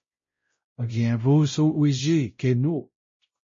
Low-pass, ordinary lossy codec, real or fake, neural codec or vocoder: 7.2 kHz; MP3, 32 kbps; fake; codec, 16 kHz, 0.5 kbps, X-Codec, WavLM features, trained on Multilingual LibriSpeech